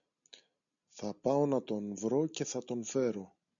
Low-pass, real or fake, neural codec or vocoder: 7.2 kHz; real; none